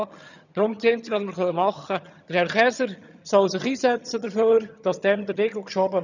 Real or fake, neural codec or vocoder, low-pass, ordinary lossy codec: fake; vocoder, 22.05 kHz, 80 mel bands, HiFi-GAN; 7.2 kHz; none